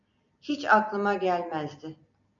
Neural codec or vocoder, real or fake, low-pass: none; real; 7.2 kHz